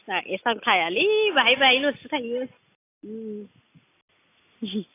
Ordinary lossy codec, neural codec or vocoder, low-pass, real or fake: AAC, 24 kbps; none; 3.6 kHz; real